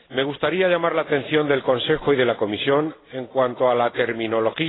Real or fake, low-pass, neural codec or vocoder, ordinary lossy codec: real; 7.2 kHz; none; AAC, 16 kbps